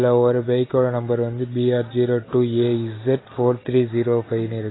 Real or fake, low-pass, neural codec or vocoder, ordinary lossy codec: real; 7.2 kHz; none; AAC, 16 kbps